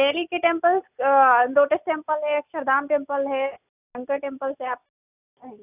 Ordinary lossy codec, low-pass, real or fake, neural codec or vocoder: none; 3.6 kHz; real; none